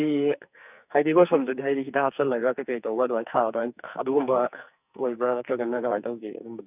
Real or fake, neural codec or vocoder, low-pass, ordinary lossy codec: fake; codec, 44.1 kHz, 2.6 kbps, SNAC; 3.6 kHz; none